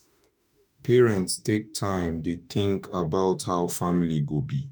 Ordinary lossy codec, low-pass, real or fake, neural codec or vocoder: none; none; fake; autoencoder, 48 kHz, 32 numbers a frame, DAC-VAE, trained on Japanese speech